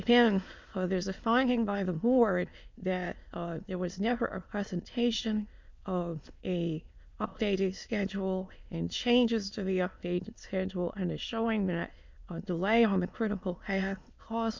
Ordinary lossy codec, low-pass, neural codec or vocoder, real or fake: MP3, 64 kbps; 7.2 kHz; autoencoder, 22.05 kHz, a latent of 192 numbers a frame, VITS, trained on many speakers; fake